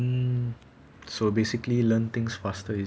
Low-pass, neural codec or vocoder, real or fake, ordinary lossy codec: none; none; real; none